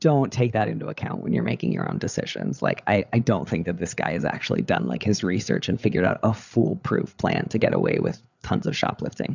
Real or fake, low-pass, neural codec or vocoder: fake; 7.2 kHz; codec, 16 kHz, 8 kbps, FreqCodec, larger model